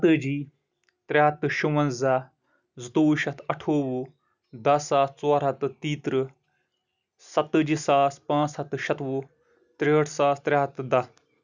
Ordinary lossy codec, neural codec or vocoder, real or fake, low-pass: none; none; real; 7.2 kHz